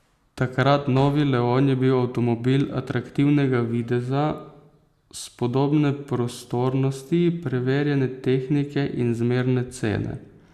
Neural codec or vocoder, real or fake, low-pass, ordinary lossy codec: none; real; 14.4 kHz; Opus, 64 kbps